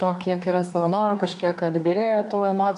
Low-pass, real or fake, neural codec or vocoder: 10.8 kHz; fake; codec, 24 kHz, 1 kbps, SNAC